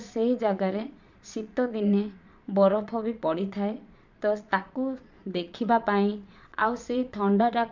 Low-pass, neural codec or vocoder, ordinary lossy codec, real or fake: 7.2 kHz; vocoder, 22.05 kHz, 80 mel bands, Vocos; MP3, 64 kbps; fake